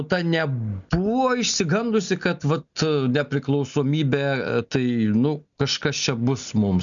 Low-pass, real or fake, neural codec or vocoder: 7.2 kHz; real; none